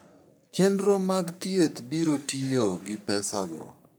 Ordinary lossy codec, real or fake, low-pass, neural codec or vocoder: none; fake; none; codec, 44.1 kHz, 3.4 kbps, Pupu-Codec